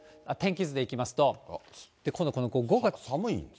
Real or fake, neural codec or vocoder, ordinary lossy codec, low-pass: real; none; none; none